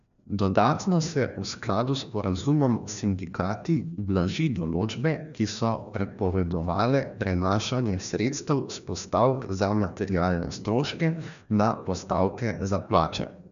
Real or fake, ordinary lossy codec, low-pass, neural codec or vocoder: fake; none; 7.2 kHz; codec, 16 kHz, 1 kbps, FreqCodec, larger model